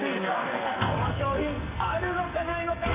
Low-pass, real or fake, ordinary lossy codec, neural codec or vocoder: 3.6 kHz; fake; Opus, 64 kbps; codec, 44.1 kHz, 2.6 kbps, SNAC